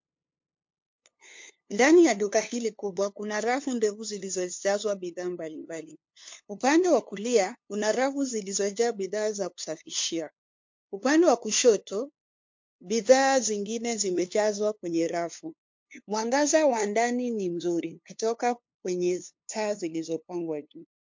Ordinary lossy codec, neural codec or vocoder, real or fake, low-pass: MP3, 48 kbps; codec, 16 kHz, 2 kbps, FunCodec, trained on LibriTTS, 25 frames a second; fake; 7.2 kHz